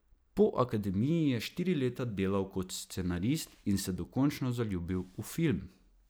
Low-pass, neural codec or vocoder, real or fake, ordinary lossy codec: none; none; real; none